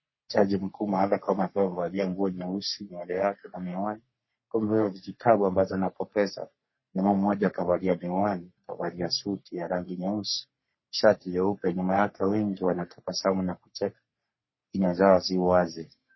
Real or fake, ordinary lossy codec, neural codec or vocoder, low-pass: fake; MP3, 24 kbps; codec, 44.1 kHz, 3.4 kbps, Pupu-Codec; 7.2 kHz